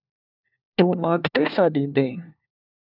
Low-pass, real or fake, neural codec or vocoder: 5.4 kHz; fake; codec, 16 kHz, 1 kbps, FunCodec, trained on LibriTTS, 50 frames a second